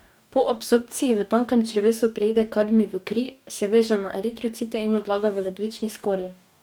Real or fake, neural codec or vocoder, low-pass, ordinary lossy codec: fake; codec, 44.1 kHz, 2.6 kbps, DAC; none; none